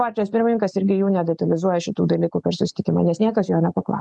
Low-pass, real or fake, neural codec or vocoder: 10.8 kHz; real; none